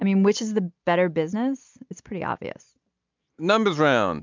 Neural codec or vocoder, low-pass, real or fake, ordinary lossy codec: none; 7.2 kHz; real; MP3, 64 kbps